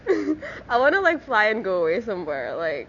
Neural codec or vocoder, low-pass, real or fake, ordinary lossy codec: none; 7.2 kHz; real; none